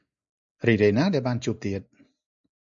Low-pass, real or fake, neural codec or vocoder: 7.2 kHz; real; none